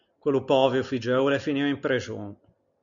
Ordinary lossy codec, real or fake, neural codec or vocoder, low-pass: MP3, 96 kbps; real; none; 7.2 kHz